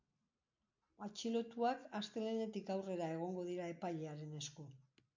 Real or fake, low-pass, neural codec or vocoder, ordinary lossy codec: real; 7.2 kHz; none; MP3, 48 kbps